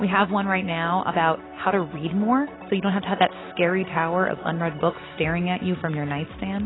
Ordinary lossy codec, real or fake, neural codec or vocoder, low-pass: AAC, 16 kbps; real; none; 7.2 kHz